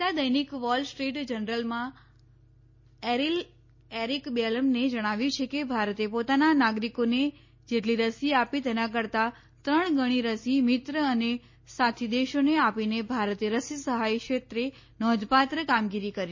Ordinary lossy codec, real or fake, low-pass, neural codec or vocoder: MP3, 32 kbps; real; 7.2 kHz; none